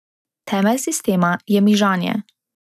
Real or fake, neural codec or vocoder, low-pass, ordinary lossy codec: real; none; 14.4 kHz; none